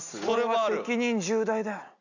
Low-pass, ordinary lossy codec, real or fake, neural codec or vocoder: 7.2 kHz; none; real; none